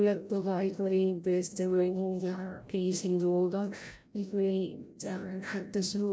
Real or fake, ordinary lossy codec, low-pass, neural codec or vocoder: fake; none; none; codec, 16 kHz, 0.5 kbps, FreqCodec, larger model